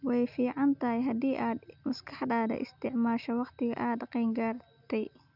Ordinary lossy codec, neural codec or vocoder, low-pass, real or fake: none; none; 5.4 kHz; real